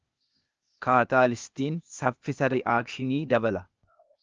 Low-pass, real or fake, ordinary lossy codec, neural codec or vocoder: 7.2 kHz; fake; Opus, 24 kbps; codec, 16 kHz, 0.8 kbps, ZipCodec